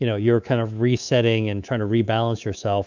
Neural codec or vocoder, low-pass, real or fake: codec, 24 kHz, 3.1 kbps, DualCodec; 7.2 kHz; fake